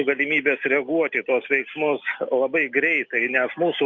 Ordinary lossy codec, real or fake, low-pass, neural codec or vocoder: Opus, 64 kbps; real; 7.2 kHz; none